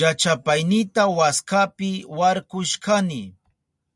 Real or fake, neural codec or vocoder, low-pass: real; none; 10.8 kHz